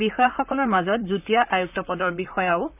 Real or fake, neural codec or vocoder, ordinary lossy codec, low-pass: fake; vocoder, 44.1 kHz, 128 mel bands, Pupu-Vocoder; none; 3.6 kHz